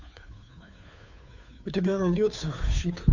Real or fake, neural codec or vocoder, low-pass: fake; codec, 16 kHz, 2 kbps, FunCodec, trained on Chinese and English, 25 frames a second; 7.2 kHz